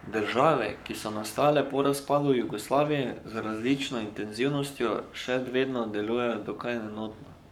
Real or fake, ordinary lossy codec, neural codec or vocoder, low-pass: fake; none; codec, 44.1 kHz, 7.8 kbps, Pupu-Codec; 19.8 kHz